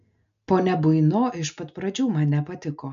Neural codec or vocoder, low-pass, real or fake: none; 7.2 kHz; real